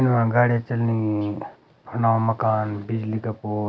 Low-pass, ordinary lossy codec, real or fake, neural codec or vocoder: none; none; real; none